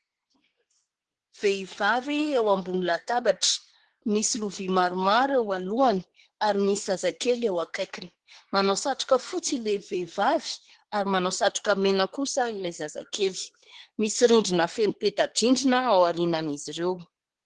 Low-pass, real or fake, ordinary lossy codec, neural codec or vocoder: 10.8 kHz; fake; Opus, 16 kbps; codec, 24 kHz, 1 kbps, SNAC